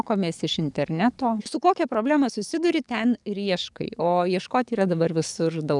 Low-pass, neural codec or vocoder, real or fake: 10.8 kHz; codec, 44.1 kHz, 7.8 kbps, DAC; fake